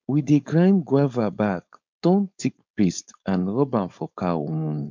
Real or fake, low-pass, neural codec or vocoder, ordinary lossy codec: fake; 7.2 kHz; codec, 16 kHz, 4.8 kbps, FACodec; MP3, 64 kbps